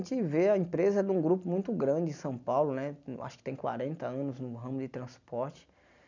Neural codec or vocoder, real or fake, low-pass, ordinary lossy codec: none; real; 7.2 kHz; none